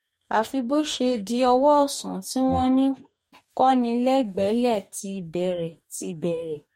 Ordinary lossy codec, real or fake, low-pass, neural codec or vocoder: MP3, 64 kbps; fake; 19.8 kHz; codec, 44.1 kHz, 2.6 kbps, DAC